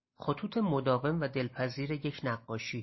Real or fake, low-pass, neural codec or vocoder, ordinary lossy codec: real; 7.2 kHz; none; MP3, 24 kbps